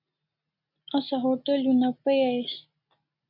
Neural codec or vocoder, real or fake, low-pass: none; real; 5.4 kHz